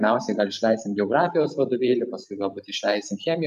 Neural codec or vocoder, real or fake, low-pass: vocoder, 44.1 kHz, 128 mel bands every 512 samples, BigVGAN v2; fake; 14.4 kHz